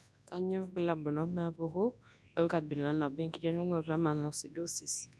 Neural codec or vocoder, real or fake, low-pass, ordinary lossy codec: codec, 24 kHz, 0.9 kbps, WavTokenizer, large speech release; fake; none; none